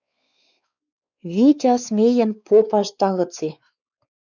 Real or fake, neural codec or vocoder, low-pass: fake; codec, 16 kHz, 4 kbps, X-Codec, WavLM features, trained on Multilingual LibriSpeech; 7.2 kHz